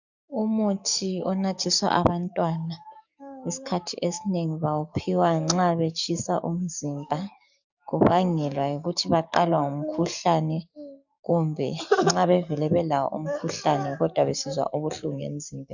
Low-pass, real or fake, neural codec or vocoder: 7.2 kHz; fake; codec, 44.1 kHz, 7.8 kbps, DAC